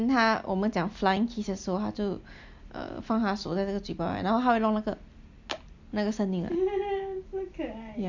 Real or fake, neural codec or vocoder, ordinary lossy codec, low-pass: real; none; MP3, 64 kbps; 7.2 kHz